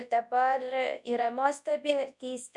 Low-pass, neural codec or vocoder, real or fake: 10.8 kHz; codec, 24 kHz, 0.9 kbps, WavTokenizer, large speech release; fake